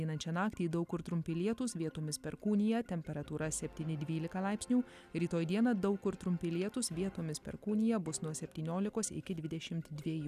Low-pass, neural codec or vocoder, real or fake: 14.4 kHz; none; real